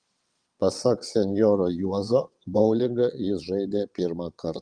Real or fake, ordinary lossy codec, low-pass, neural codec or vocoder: fake; Opus, 32 kbps; 9.9 kHz; vocoder, 22.05 kHz, 80 mel bands, WaveNeXt